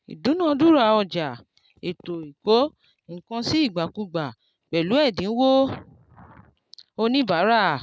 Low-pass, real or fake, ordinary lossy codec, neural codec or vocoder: none; real; none; none